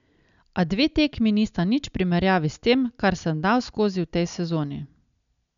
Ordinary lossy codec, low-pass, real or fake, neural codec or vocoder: none; 7.2 kHz; real; none